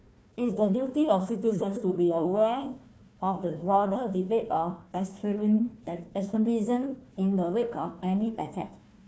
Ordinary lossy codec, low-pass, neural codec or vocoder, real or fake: none; none; codec, 16 kHz, 1 kbps, FunCodec, trained on Chinese and English, 50 frames a second; fake